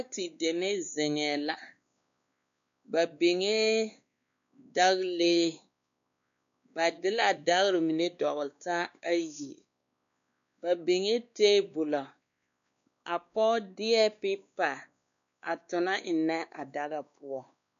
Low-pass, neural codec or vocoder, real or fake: 7.2 kHz; codec, 16 kHz, 2 kbps, X-Codec, WavLM features, trained on Multilingual LibriSpeech; fake